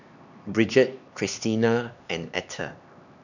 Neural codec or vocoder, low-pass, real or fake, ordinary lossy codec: codec, 16 kHz, 2 kbps, X-Codec, HuBERT features, trained on LibriSpeech; 7.2 kHz; fake; none